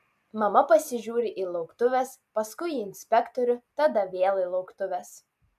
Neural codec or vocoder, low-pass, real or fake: none; 14.4 kHz; real